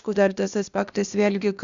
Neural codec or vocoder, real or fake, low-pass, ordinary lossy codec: codec, 16 kHz, 0.8 kbps, ZipCodec; fake; 7.2 kHz; Opus, 64 kbps